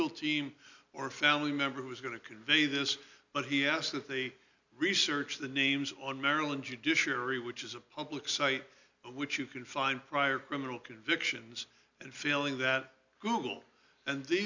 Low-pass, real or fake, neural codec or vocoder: 7.2 kHz; real; none